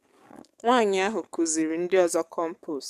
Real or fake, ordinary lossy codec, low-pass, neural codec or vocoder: fake; MP3, 96 kbps; 14.4 kHz; codec, 44.1 kHz, 7.8 kbps, DAC